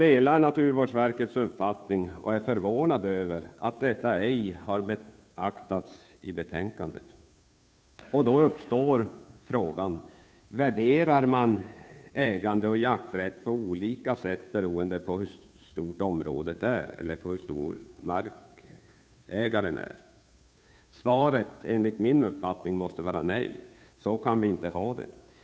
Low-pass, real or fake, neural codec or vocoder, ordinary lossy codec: none; fake; codec, 16 kHz, 2 kbps, FunCodec, trained on Chinese and English, 25 frames a second; none